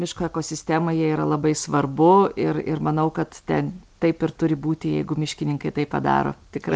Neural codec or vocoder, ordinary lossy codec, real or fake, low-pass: none; Opus, 32 kbps; real; 9.9 kHz